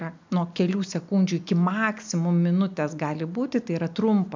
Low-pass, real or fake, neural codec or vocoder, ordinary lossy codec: 7.2 kHz; real; none; MP3, 64 kbps